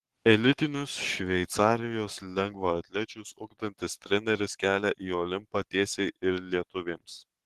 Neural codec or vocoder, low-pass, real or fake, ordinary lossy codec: codec, 44.1 kHz, 7.8 kbps, Pupu-Codec; 14.4 kHz; fake; Opus, 16 kbps